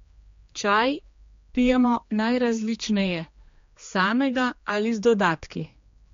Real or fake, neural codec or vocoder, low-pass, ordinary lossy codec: fake; codec, 16 kHz, 2 kbps, X-Codec, HuBERT features, trained on general audio; 7.2 kHz; MP3, 48 kbps